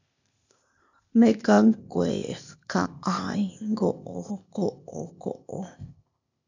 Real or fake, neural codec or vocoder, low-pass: fake; codec, 16 kHz, 0.8 kbps, ZipCodec; 7.2 kHz